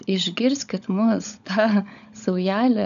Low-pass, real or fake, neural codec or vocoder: 7.2 kHz; fake; codec, 16 kHz, 16 kbps, FunCodec, trained on LibriTTS, 50 frames a second